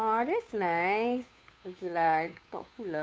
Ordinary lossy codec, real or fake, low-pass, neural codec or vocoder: none; fake; none; codec, 16 kHz, 2 kbps, FunCodec, trained on Chinese and English, 25 frames a second